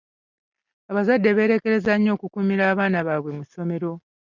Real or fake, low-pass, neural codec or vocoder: real; 7.2 kHz; none